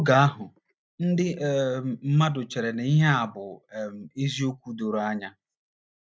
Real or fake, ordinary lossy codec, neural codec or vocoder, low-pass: real; none; none; none